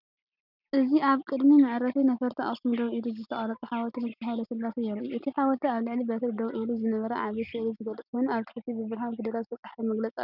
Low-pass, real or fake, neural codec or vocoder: 5.4 kHz; real; none